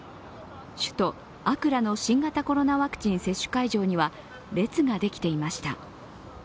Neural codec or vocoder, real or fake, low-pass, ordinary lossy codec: none; real; none; none